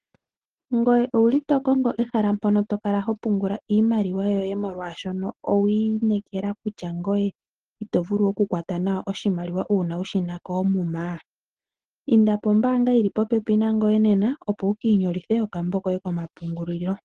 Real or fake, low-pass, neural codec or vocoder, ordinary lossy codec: real; 9.9 kHz; none; Opus, 16 kbps